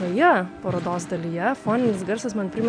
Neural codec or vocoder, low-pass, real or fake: none; 9.9 kHz; real